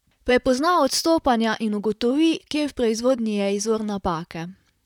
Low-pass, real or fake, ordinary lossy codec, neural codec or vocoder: 19.8 kHz; fake; none; vocoder, 44.1 kHz, 128 mel bands, Pupu-Vocoder